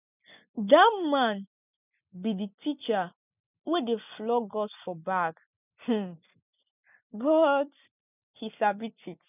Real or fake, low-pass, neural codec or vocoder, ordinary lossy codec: real; 3.6 kHz; none; none